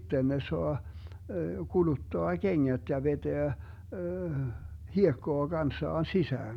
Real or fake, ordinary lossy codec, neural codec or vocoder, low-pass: real; none; none; 19.8 kHz